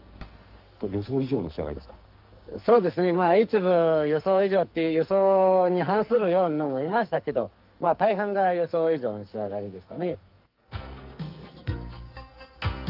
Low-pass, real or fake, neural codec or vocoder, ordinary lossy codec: 5.4 kHz; fake; codec, 44.1 kHz, 2.6 kbps, SNAC; Opus, 24 kbps